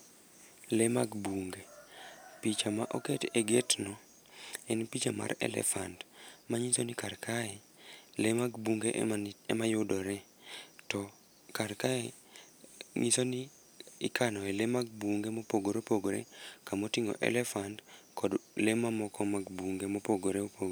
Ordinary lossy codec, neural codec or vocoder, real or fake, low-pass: none; none; real; none